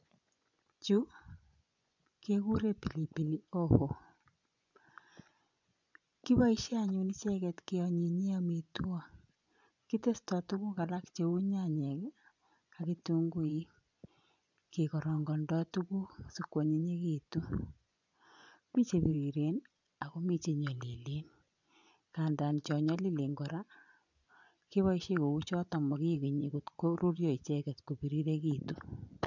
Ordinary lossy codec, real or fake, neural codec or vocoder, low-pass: none; fake; vocoder, 24 kHz, 100 mel bands, Vocos; 7.2 kHz